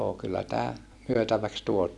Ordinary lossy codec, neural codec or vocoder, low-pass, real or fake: none; none; none; real